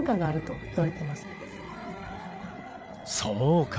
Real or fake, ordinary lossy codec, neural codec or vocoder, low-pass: fake; none; codec, 16 kHz, 8 kbps, FreqCodec, larger model; none